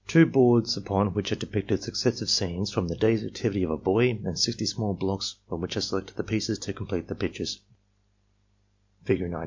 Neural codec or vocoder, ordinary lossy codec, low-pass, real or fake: none; MP3, 48 kbps; 7.2 kHz; real